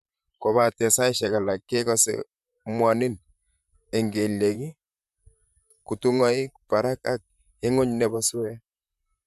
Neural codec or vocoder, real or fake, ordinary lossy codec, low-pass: vocoder, 44.1 kHz, 128 mel bands, Pupu-Vocoder; fake; none; 14.4 kHz